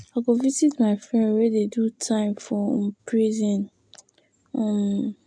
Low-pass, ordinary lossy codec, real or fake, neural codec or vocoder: 9.9 kHz; MP3, 64 kbps; real; none